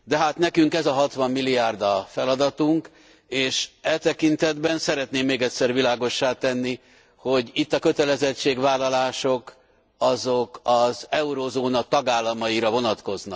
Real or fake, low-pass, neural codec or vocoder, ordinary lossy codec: real; none; none; none